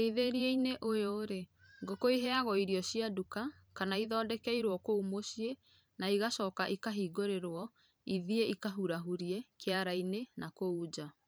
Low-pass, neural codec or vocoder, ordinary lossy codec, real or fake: none; vocoder, 44.1 kHz, 128 mel bands every 512 samples, BigVGAN v2; none; fake